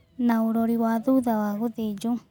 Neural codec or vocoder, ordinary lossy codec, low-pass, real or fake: none; none; 19.8 kHz; real